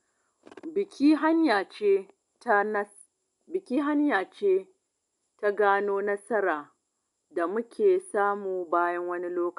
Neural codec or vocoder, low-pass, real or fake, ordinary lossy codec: none; 10.8 kHz; real; none